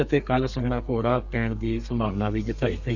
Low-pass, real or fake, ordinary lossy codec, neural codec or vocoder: 7.2 kHz; fake; none; codec, 32 kHz, 1.9 kbps, SNAC